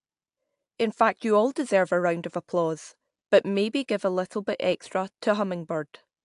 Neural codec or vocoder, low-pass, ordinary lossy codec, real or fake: none; 10.8 kHz; AAC, 64 kbps; real